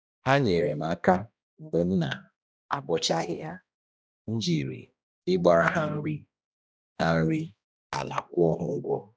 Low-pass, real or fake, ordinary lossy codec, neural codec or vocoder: none; fake; none; codec, 16 kHz, 1 kbps, X-Codec, HuBERT features, trained on balanced general audio